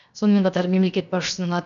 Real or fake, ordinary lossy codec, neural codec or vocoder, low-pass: fake; none; codec, 16 kHz, 0.7 kbps, FocalCodec; 7.2 kHz